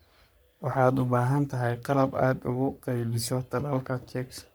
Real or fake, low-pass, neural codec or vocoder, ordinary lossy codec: fake; none; codec, 44.1 kHz, 3.4 kbps, Pupu-Codec; none